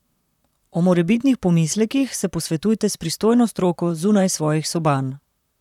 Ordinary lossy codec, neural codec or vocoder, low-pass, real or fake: none; vocoder, 44.1 kHz, 128 mel bands, Pupu-Vocoder; 19.8 kHz; fake